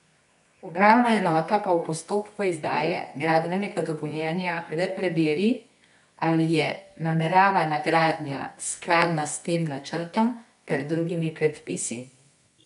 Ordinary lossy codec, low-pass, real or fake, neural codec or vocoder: none; 10.8 kHz; fake; codec, 24 kHz, 0.9 kbps, WavTokenizer, medium music audio release